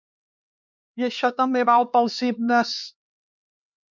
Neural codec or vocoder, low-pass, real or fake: codec, 16 kHz, 4 kbps, X-Codec, HuBERT features, trained on LibriSpeech; 7.2 kHz; fake